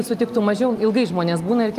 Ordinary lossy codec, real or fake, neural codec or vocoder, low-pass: Opus, 32 kbps; real; none; 14.4 kHz